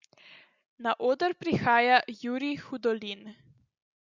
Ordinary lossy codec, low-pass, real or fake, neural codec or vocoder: Opus, 64 kbps; 7.2 kHz; real; none